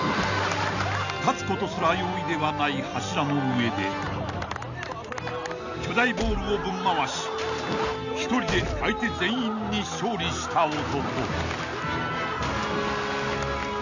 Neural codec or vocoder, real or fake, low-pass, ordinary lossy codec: none; real; 7.2 kHz; none